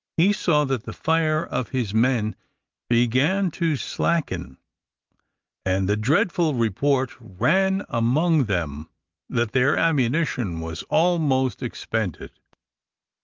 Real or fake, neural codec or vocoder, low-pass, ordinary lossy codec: real; none; 7.2 kHz; Opus, 32 kbps